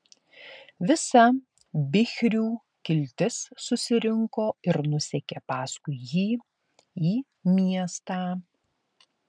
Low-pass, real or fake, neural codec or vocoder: 9.9 kHz; real; none